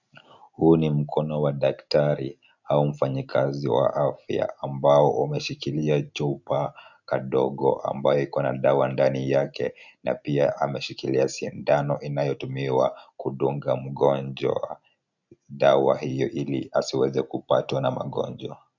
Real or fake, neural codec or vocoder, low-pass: real; none; 7.2 kHz